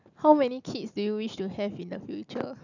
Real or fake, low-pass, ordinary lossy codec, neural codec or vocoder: real; 7.2 kHz; none; none